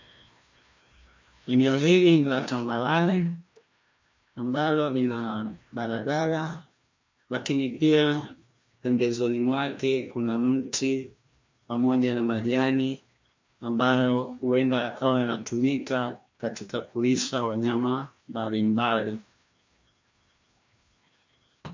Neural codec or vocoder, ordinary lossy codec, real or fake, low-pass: codec, 16 kHz, 1 kbps, FreqCodec, larger model; MP3, 48 kbps; fake; 7.2 kHz